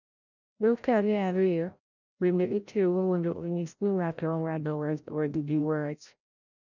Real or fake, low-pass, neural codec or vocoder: fake; 7.2 kHz; codec, 16 kHz, 0.5 kbps, FreqCodec, larger model